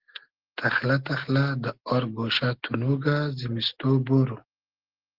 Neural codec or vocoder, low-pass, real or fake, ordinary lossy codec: none; 5.4 kHz; real; Opus, 16 kbps